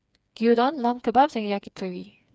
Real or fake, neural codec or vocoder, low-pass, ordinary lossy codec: fake; codec, 16 kHz, 4 kbps, FreqCodec, smaller model; none; none